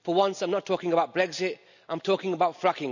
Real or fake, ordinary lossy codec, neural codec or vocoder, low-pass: real; none; none; 7.2 kHz